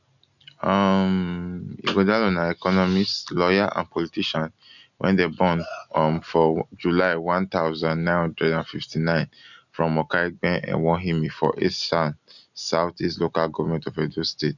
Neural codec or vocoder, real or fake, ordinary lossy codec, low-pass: none; real; none; 7.2 kHz